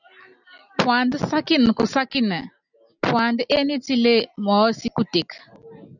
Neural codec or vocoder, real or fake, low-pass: none; real; 7.2 kHz